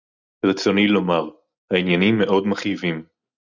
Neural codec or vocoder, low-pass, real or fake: none; 7.2 kHz; real